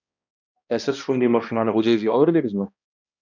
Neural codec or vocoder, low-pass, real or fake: codec, 16 kHz, 1 kbps, X-Codec, HuBERT features, trained on balanced general audio; 7.2 kHz; fake